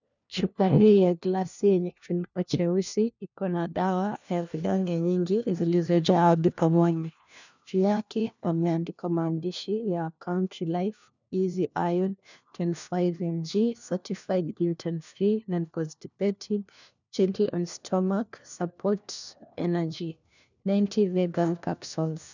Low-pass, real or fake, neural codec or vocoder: 7.2 kHz; fake; codec, 16 kHz, 1 kbps, FunCodec, trained on LibriTTS, 50 frames a second